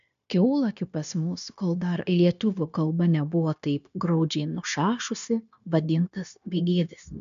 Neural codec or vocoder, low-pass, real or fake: codec, 16 kHz, 0.9 kbps, LongCat-Audio-Codec; 7.2 kHz; fake